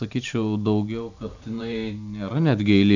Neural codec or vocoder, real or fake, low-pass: vocoder, 44.1 kHz, 128 mel bands every 512 samples, BigVGAN v2; fake; 7.2 kHz